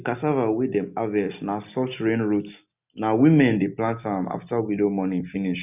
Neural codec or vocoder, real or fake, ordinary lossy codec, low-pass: none; real; none; 3.6 kHz